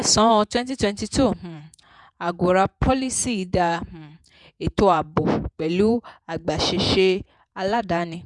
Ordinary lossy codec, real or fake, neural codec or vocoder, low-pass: none; fake; vocoder, 48 kHz, 128 mel bands, Vocos; 10.8 kHz